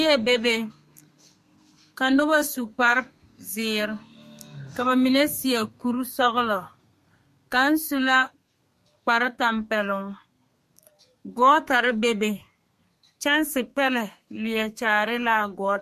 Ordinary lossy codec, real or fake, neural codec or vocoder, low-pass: MP3, 64 kbps; fake; codec, 32 kHz, 1.9 kbps, SNAC; 14.4 kHz